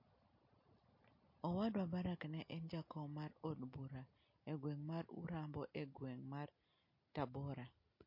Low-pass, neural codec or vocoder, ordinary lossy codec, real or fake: 5.4 kHz; none; MP3, 32 kbps; real